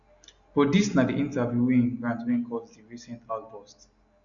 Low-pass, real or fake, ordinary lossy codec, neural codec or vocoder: 7.2 kHz; real; none; none